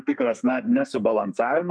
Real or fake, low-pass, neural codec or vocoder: fake; 9.9 kHz; codec, 32 kHz, 1.9 kbps, SNAC